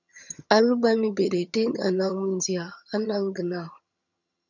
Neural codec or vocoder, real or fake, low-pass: vocoder, 22.05 kHz, 80 mel bands, HiFi-GAN; fake; 7.2 kHz